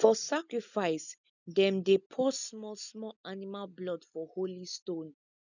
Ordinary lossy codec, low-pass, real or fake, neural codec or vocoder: none; 7.2 kHz; real; none